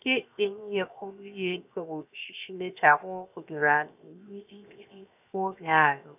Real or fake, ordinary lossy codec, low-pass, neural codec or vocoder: fake; none; 3.6 kHz; codec, 16 kHz, 0.7 kbps, FocalCodec